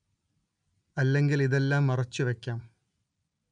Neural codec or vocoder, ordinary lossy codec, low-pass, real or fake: none; none; 9.9 kHz; real